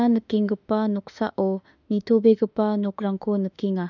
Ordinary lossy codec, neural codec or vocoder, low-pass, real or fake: none; autoencoder, 48 kHz, 32 numbers a frame, DAC-VAE, trained on Japanese speech; 7.2 kHz; fake